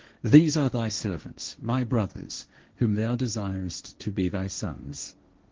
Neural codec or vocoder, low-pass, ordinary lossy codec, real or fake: codec, 16 kHz, 1.1 kbps, Voila-Tokenizer; 7.2 kHz; Opus, 16 kbps; fake